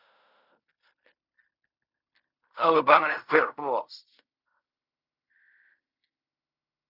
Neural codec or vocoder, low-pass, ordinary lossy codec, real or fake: codec, 16 kHz in and 24 kHz out, 0.4 kbps, LongCat-Audio-Codec, fine tuned four codebook decoder; 5.4 kHz; Opus, 64 kbps; fake